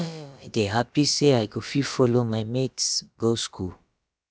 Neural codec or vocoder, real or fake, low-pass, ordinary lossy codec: codec, 16 kHz, about 1 kbps, DyCAST, with the encoder's durations; fake; none; none